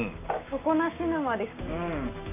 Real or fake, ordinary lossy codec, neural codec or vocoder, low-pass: fake; none; codec, 16 kHz, 6 kbps, DAC; 3.6 kHz